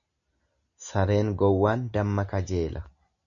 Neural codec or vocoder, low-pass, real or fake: none; 7.2 kHz; real